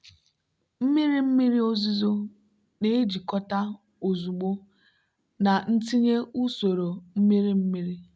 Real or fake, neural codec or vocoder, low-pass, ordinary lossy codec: real; none; none; none